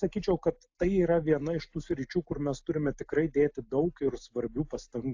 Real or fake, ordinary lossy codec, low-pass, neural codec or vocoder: real; AAC, 48 kbps; 7.2 kHz; none